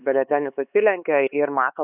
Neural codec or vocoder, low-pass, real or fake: codec, 16 kHz, 4 kbps, X-Codec, HuBERT features, trained on LibriSpeech; 3.6 kHz; fake